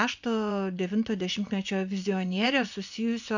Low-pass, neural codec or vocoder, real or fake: 7.2 kHz; vocoder, 24 kHz, 100 mel bands, Vocos; fake